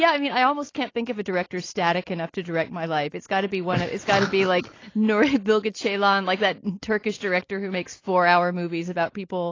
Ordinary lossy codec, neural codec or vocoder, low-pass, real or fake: AAC, 32 kbps; none; 7.2 kHz; real